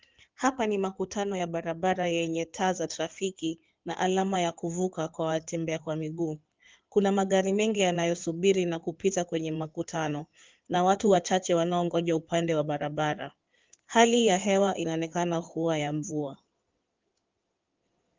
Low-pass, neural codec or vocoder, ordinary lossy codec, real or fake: 7.2 kHz; codec, 16 kHz in and 24 kHz out, 2.2 kbps, FireRedTTS-2 codec; Opus, 32 kbps; fake